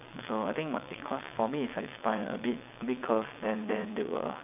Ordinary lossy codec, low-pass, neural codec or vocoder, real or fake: none; 3.6 kHz; vocoder, 22.05 kHz, 80 mel bands, WaveNeXt; fake